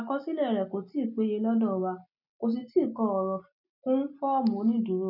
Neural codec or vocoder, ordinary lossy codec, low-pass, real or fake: none; none; 5.4 kHz; real